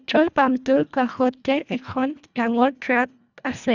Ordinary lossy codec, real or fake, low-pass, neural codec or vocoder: none; fake; 7.2 kHz; codec, 24 kHz, 1.5 kbps, HILCodec